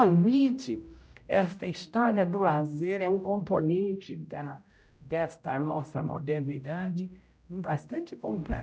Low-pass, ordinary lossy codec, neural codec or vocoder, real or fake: none; none; codec, 16 kHz, 0.5 kbps, X-Codec, HuBERT features, trained on general audio; fake